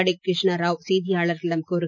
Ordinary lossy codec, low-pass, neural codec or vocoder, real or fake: none; 7.2 kHz; none; real